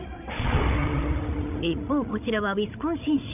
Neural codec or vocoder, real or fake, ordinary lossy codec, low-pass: codec, 16 kHz, 16 kbps, FreqCodec, larger model; fake; none; 3.6 kHz